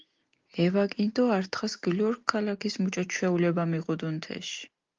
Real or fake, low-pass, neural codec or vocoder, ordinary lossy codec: real; 7.2 kHz; none; Opus, 32 kbps